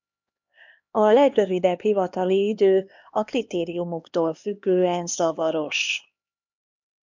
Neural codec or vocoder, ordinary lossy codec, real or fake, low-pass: codec, 16 kHz, 2 kbps, X-Codec, HuBERT features, trained on LibriSpeech; MP3, 64 kbps; fake; 7.2 kHz